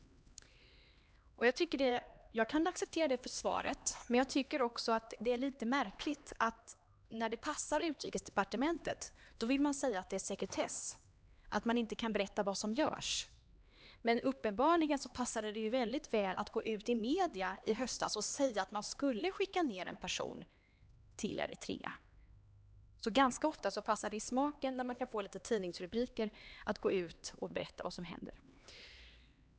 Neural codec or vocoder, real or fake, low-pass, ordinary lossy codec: codec, 16 kHz, 2 kbps, X-Codec, HuBERT features, trained on LibriSpeech; fake; none; none